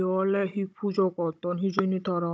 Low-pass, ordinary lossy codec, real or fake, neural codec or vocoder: none; none; fake; codec, 16 kHz, 16 kbps, FunCodec, trained on Chinese and English, 50 frames a second